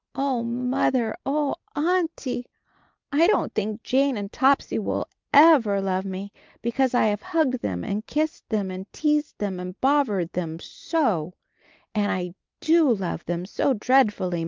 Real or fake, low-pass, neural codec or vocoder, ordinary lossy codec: real; 7.2 kHz; none; Opus, 24 kbps